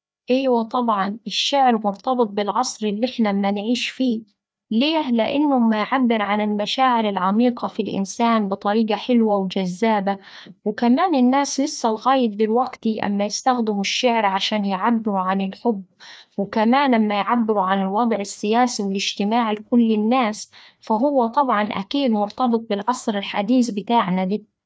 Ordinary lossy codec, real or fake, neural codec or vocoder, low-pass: none; fake; codec, 16 kHz, 2 kbps, FreqCodec, larger model; none